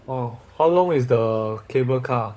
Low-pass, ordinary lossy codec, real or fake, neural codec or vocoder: none; none; fake; codec, 16 kHz, 16 kbps, FunCodec, trained on LibriTTS, 50 frames a second